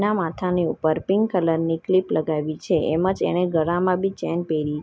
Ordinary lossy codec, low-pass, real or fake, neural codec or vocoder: none; none; real; none